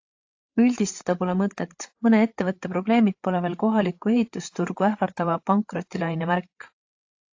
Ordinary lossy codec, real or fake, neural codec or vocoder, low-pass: AAC, 48 kbps; fake; codec, 16 kHz, 8 kbps, FreqCodec, larger model; 7.2 kHz